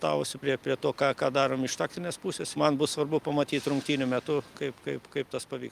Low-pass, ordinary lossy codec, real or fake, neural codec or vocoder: 14.4 kHz; Opus, 32 kbps; real; none